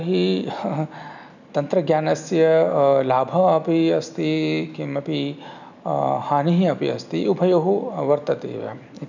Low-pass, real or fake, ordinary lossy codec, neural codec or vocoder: 7.2 kHz; real; none; none